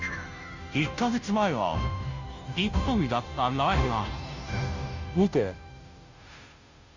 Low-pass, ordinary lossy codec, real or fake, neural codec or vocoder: 7.2 kHz; Opus, 64 kbps; fake; codec, 16 kHz, 0.5 kbps, FunCodec, trained on Chinese and English, 25 frames a second